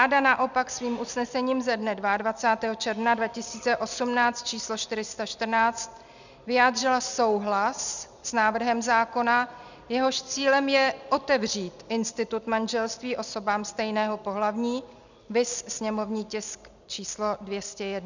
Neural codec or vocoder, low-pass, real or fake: none; 7.2 kHz; real